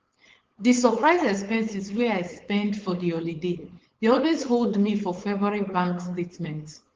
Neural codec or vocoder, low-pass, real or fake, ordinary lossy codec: codec, 16 kHz, 4.8 kbps, FACodec; 7.2 kHz; fake; Opus, 16 kbps